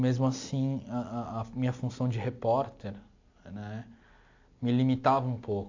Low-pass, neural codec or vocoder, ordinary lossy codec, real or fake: 7.2 kHz; none; AAC, 48 kbps; real